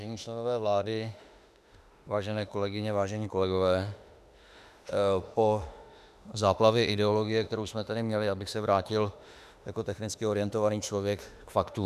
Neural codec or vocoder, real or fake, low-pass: autoencoder, 48 kHz, 32 numbers a frame, DAC-VAE, trained on Japanese speech; fake; 14.4 kHz